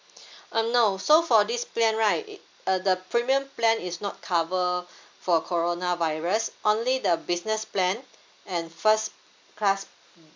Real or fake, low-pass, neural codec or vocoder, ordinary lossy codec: real; 7.2 kHz; none; MP3, 64 kbps